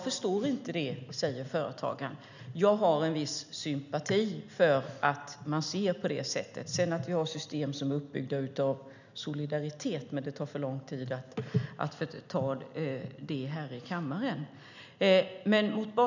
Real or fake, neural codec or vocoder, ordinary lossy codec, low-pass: real; none; none; 7.2 kHz